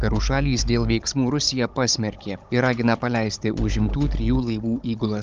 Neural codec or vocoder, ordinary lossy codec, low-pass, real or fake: codec, 16 kHz, 16 kbps, FunCodec, trained on Chinese and English, 50 frames a second; Opus, 32 kbps; 7.2 kHz; fake